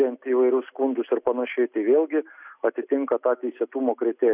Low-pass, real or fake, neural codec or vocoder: 3.6 kHz; real; none